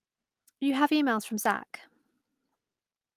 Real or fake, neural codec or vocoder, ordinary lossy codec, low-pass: real; none; Opus, 32 kbps; 14.4 kHz